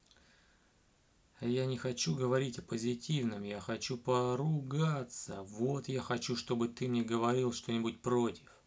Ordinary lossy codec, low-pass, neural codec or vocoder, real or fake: none; none; none; real